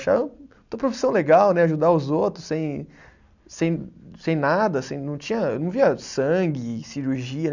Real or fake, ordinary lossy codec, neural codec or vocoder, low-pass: real; none; none; 7.2 kHz